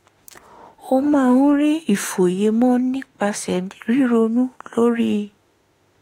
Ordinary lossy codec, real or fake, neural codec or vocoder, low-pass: AAC, 48 kbps; fake; autoencoder, 48 kHz, 32 numbers a frame, DAC-VAE, trained on Japanese speech; 19.8 kHz